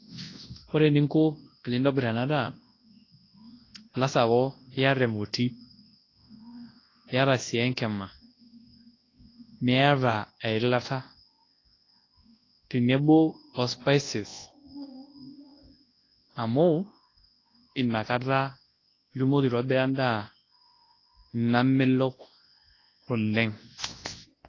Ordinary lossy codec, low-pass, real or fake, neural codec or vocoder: AAC, 32 kbps; 7.2 kHz; fake; codec, 24 kHz, 0.9 kbps, WavTokenizer, large speech release